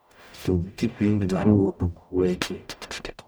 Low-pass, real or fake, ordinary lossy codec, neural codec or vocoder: none; fake; none; codec, 44.1 kHz, 0.9 kbps, DAC